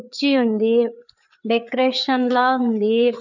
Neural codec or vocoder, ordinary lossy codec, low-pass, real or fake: codec, 16 kHz, 4 kbps, FreqCodec, larger model; none; 7.2 kHz; fake